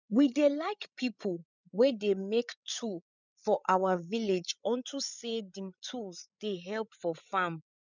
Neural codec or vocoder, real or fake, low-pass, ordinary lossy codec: codec, 16 kHz, 8 kbps, FreqCodec, larger model; fake; 7.2 kHz; none